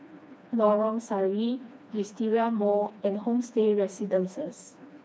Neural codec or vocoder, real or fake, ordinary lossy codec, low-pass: codec, 16 kHz, 2 kbps, FreqCodec, smaller model; fake; none; none